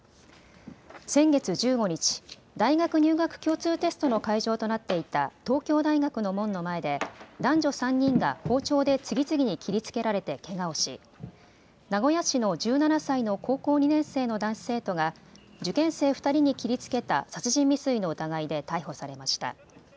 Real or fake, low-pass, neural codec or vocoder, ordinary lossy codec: real; none; none; none